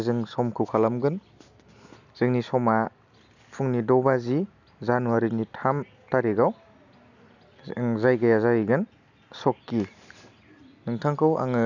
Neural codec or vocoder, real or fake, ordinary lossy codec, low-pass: none; real; none; 7.2 kHz